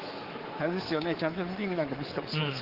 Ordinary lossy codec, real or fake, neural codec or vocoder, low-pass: Opus, 16 kbps; fake; codec, 24 kHz, 3.1 kbps, DualCodec; 5.4 kHz